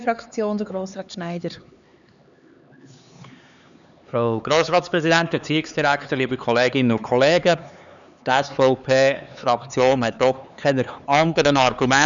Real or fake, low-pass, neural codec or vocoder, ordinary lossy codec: fake; 7.2 kHz; codec, 16 kHz, 4 kbps, X-Codec, HuBERT features, trained on LibriSpeech; none